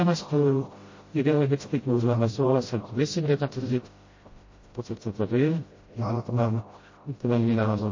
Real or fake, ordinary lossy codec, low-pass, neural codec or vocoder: fake; MP3, 32 kbps; 7.2 kHz; codec, 16 kHz, 0.5 kbps, FreqCodec, smaller model